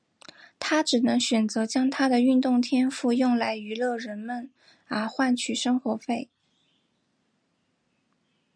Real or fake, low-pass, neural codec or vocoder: real; 9.9 kHz; none